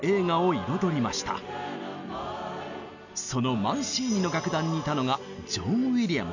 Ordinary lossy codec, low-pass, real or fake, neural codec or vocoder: none; 7.2 kHz; real; none